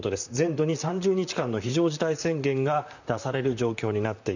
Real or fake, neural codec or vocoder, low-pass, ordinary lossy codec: fake; vocoder, 44.1 kHz, 128 mel bands, Pupu-Vocoder; 7.2 kHz; none